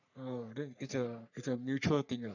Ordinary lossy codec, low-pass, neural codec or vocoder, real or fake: none; 7.2 kHz; codec, 44.1 kHz, 3.4 kbps, Pupu-Codec; fake